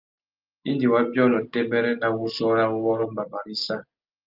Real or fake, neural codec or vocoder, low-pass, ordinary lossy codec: real; none; 5.4 kHz; Opus, 32 kbps